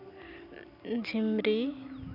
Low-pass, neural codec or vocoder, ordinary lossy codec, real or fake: 5.4 kHz; none; none; real